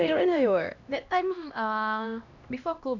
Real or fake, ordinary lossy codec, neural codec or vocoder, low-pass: fake; none; codec, 16 kHz, 1 kbps, X-Codec, HuBERT features, trained on LibriSpeech; 7.2 kHz